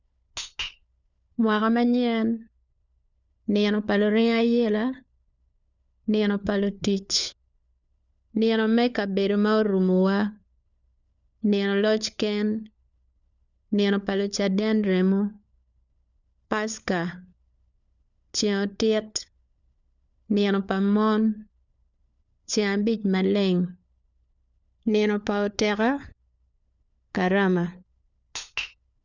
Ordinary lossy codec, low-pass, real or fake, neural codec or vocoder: none; 7.2 kHz; fake; codec, 16 kHz, 4 kbps, FunCodec, trained on LibriTTS, 50 frames a second